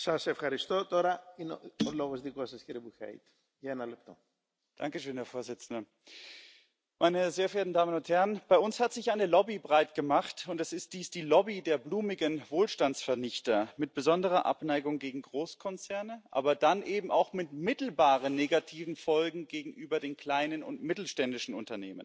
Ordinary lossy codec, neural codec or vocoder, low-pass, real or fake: none; none; none; real